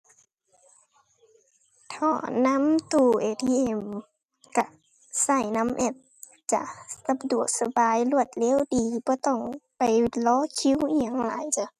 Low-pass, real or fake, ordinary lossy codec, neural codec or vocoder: 14.4 kHz; real; none; none